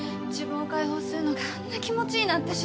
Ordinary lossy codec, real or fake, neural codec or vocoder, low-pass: none; real; none; none